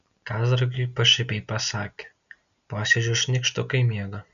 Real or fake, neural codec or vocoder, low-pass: real; none; 7.2 kHz